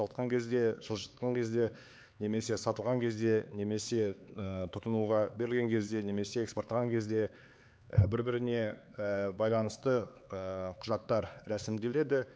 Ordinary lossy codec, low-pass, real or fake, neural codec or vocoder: none; none; fake; codec, 16 kHz, 4 kbps, X-Codec, HuBERT features, trained on LibriSpeech